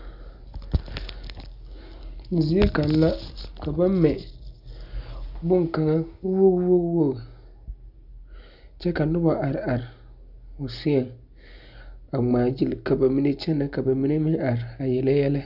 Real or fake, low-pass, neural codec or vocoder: real; 5.4 kHz; none